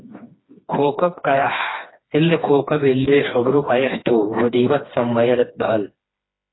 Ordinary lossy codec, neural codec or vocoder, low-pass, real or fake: AAC, 16 kbps; codec, 16 kHz, 2 kbps, FreqCodec, smaller model; 7.2 kHz; fake